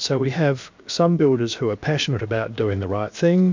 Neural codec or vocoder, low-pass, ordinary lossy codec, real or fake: codec, 16 kHz, about 1 kbps, DyCAST, with the encoder's durations; 7.2 kHz; MP3, 64 kbps; fake